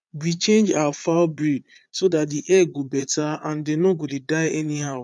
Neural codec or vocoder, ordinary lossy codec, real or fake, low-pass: vocoder, 22.05 kHz, 80 mel bands, Vocos; none; fake; none